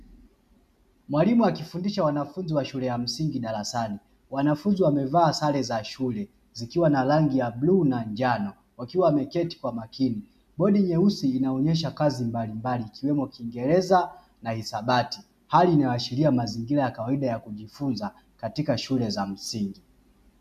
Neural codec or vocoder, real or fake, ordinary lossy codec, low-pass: none; real; MP3, 96 kbps; 14.4 kHz